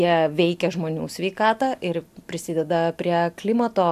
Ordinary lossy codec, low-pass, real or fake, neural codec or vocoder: AAC, 96 kbps; 14.4 kHz; real; none